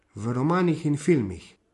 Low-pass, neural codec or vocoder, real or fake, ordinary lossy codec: 14.4 kHz; none; real; MP3, 48 kbps